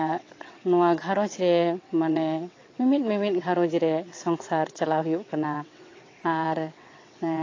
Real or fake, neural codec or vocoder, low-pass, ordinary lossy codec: fake; vocoder, 22.05 kHz, 80 mel bands, WaveNeXt; 7.2 kHz; AAC, 32 kbps